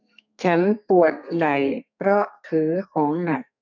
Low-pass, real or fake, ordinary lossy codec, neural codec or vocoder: 7.2 kHz; fake; none; codec, 32 kHz, 1.9 kbps, SNAC